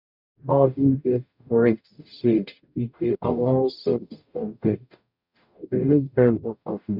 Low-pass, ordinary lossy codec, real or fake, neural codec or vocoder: 5.4 kHz; none; fake; codec, 44.1 kHz, 0.9 kbps, DAC